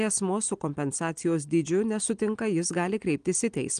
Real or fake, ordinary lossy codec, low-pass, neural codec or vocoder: real; Opus, 24 kbps; 9.9 kHz; none